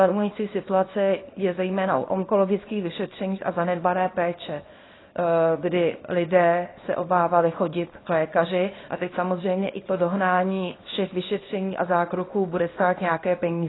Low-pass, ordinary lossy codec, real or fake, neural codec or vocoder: 7.2 kHz; AAC, 16 kbps; fake; codec, 24 kHz, 0.9 kbps, WavTokenizer, small release